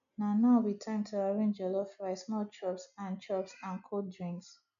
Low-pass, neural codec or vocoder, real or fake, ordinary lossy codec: 7.2 kHz; none; real; none